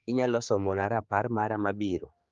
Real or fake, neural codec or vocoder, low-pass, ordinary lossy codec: fake; codec, 16 kHz, 4 kbps, X-Codec, HuBERT features, trained on general audio; 7.2 kHz; Opus, 32 kbps